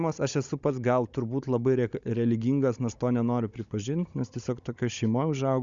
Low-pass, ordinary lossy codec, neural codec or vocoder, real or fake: 7.2 kHz; Opus, 64 kbps; codec, 16 kHz, 16 kbps, FunCodec, trained on Chinese and English, 50 frames a second; fake